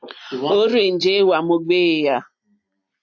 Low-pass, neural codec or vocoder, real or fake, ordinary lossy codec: 7.2 kHz; none; real; AAC, 48 kbps